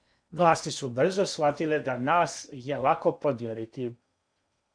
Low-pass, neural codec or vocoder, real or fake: 9.9 kHz; codec, 16 kHz in and 24 kHz out, 0.8 kbps, FocalCodec, streaming, 65536 codes; fake